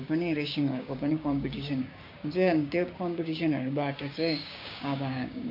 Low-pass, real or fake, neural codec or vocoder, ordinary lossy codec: 5.4 kHz; fake; codec, 16 kHz, 6 kbps, DAC; none